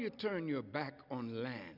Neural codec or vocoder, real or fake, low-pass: none; real; 5.4 kHz